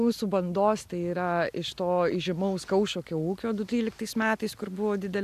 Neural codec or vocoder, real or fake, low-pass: none; real; 14.4 kHz